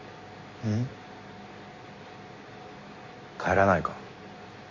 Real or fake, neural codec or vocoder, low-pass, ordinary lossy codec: real; none; 7.2 kHz; MP3, 32 kbps